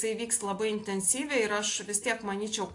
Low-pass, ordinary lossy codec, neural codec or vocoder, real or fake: 10.8 kHz; AAC, 48 kbps; none; real